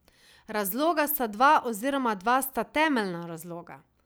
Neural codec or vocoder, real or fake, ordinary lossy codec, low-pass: none; real; none; none